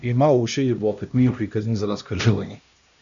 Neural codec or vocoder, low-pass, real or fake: codec, 16 kHz, 1 kbps, X-Codec, HuBERT features, trained on LibriSpeech; 7.2 kHz; fake